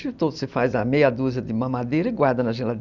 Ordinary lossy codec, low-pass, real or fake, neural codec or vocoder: Opus, 64 kbps; 7.2 kHz; real; none